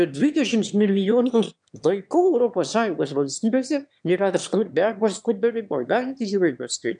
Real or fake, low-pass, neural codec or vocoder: fake; 9.9 kHz; autoencoder, 22.05 kHz, a latent of 192 numbers a frame, VITS, trained on one speaker